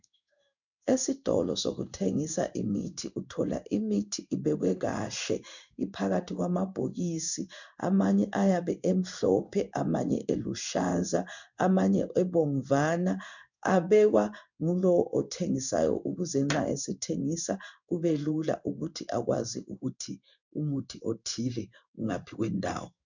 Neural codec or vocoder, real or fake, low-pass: codec, 16 kHz in and 24 kHz out, 1 kbps, XY-Tokenizer; fake; 7.2 kHz